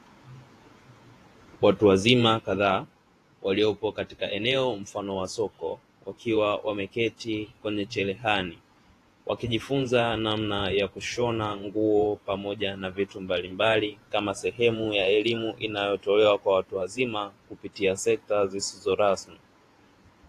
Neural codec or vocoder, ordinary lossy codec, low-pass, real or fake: vocoder, 48 kHz, 128 mel bands, Vocos; AAC, 48 kbps; 14.4 kHz; fake